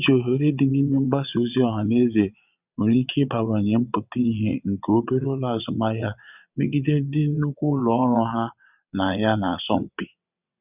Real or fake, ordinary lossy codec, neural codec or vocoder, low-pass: fake; none; vocoder, 22.05 kHz, 80 mel bands, WaveNeXt; 3.6 kHz